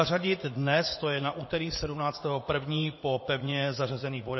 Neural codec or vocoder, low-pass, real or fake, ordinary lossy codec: vocoder, 44.1 kHz, 80 mel bands, Vocos; 7.2 kHz; fake; MP3, 24 kbps